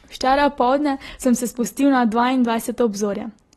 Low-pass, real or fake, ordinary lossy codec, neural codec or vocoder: 19.8 kHz; real; AAC, 32 kbps; none